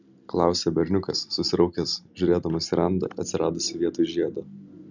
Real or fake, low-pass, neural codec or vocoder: real; 7.2 kHz; none